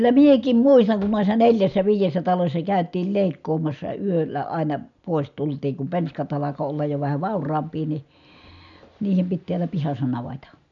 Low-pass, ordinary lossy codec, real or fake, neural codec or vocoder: 7.2 kHz; none; real; none